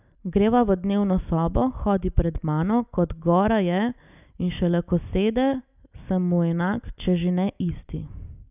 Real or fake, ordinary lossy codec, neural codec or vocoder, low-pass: real; none; none; 3.6 kHz